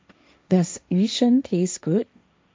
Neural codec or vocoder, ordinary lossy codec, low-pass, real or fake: codec, 16 kHz, 1.1 kbps, Voila-Tokenizer; none; none; fake